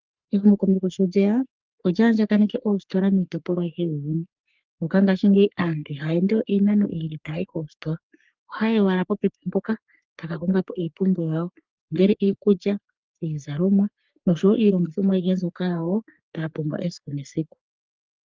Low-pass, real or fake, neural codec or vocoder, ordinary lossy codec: 7.2 kHz; fake; codec, 44.1 kHz, 3.4 kbps, Pupu-Codec; Opus, 24 kbps